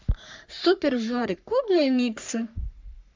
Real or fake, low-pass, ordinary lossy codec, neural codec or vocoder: fake; 7.2 kHz; MP3, 64 kbps; codec, 44.1 kHz, 3.4 kbps, Pupu-Codec